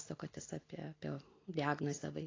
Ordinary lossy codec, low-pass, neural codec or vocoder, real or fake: AAC, 32 kbps; 7.2 kHz; none; real